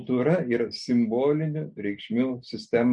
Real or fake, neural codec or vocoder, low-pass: real; none; 10.8 kHz